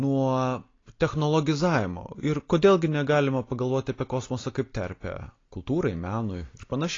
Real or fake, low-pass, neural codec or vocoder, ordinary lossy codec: real; 7.2 kHz; none; AAC, 32 kbps